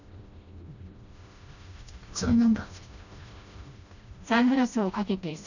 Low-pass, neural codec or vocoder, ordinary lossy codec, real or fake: 7.2 kHz; codec, 16 kHz, 1 kbps, FreqCodec, smaller model; none; fake